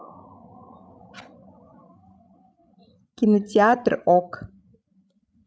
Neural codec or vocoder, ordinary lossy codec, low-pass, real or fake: codec, 16 kHz, 16 kbps, FreqCodec, larger model; none; none; fake